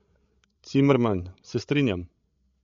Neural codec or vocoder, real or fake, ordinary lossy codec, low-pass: codec, 16 kHz, 16 kbps, FreqCodec, larger model; fake; MP3, 48 kbps; 7.2 kHz